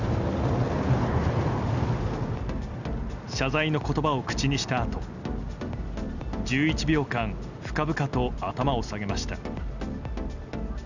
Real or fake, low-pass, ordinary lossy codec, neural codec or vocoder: real; 7.2 kHz; none; none